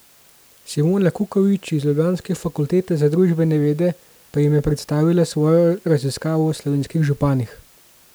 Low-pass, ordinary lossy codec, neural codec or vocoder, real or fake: none; none; none; real